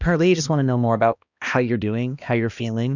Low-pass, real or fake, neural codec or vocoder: 7.2 kHz; fake; codec, 16 kHz, 1 kbps, X-Codec, HuBERT features, trained on balanced general audio